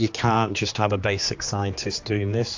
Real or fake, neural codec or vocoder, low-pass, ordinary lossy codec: fake; codec, 16 kHz, 2 kbps, X-Codec, HuBERT features, trained on general audio; 7.2 kHz; AAC, 48 kbps